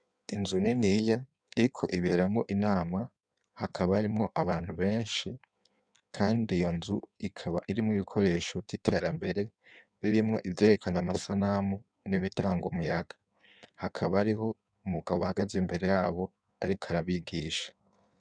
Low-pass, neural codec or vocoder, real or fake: 9.9 kHz; codec, 16 kHz in and 24 kHz out, 1.1 kbps, FireRedTTS-2 codec; fake